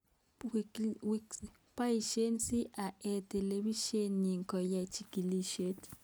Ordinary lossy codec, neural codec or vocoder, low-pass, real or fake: none; none; none; real